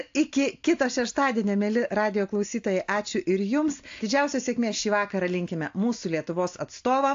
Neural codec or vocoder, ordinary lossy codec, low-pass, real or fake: none; AAC, 48 kbps; 7.2 kHz; real